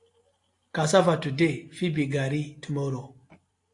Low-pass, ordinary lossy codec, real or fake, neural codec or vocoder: 10.8 kHz; AAC, 48 kbps; real; none